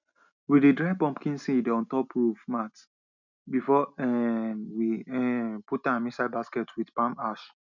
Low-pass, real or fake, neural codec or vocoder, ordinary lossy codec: 7.2 kHz; real; none; none